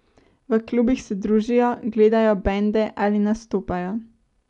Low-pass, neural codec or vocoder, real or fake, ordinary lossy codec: 10.8 kHz; none; real; none